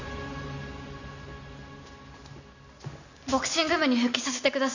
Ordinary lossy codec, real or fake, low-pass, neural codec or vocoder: none; real; 7.2 kHz; none